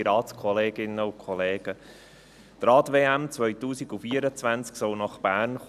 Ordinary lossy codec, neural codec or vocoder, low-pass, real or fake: none; none; 14.4 kHz; real